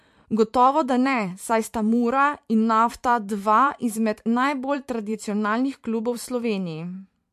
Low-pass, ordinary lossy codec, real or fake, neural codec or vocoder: 14.4 kHz; MP3, 64 kbps; fake; vocoder, 44.1 kHz, 128 mel bands every 512 samples, BigVGAN v2